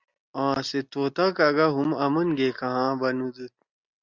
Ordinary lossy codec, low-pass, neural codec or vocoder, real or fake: Opus, 64 kbps; 7.2 kHz; none; real